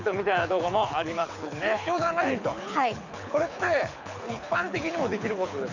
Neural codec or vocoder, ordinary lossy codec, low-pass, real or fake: codec, 24 kHz, 6 kbps, HILCodec; none; 7.2 kHz; fake